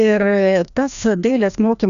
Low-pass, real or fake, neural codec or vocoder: 7.2 kHz; fake; codec, 16 kHz, 1 kbps, FreqCodec, larger model